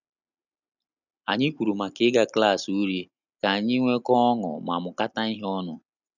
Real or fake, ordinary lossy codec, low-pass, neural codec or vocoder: real; none; 7.2 kHz; none